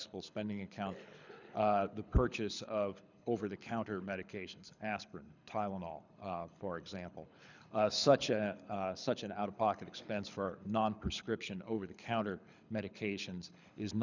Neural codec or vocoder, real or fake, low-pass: codec, 24 kHz, 6 kbps, HILCodec; fake; 7.2 kHz